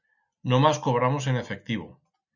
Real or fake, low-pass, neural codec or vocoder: real; 7.2 kHz; none